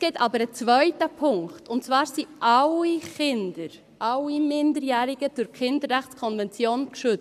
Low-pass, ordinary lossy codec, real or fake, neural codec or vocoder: 14.4 kHz; none; fake; codec, 44.1 kHz, 7.8 kbps, Pupu-Codec